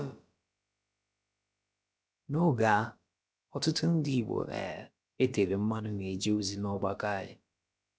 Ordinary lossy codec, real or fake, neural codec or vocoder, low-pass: none; fake; codec, 16 kHz, about 1 kbps, DyCAST, with the encoder's durations; none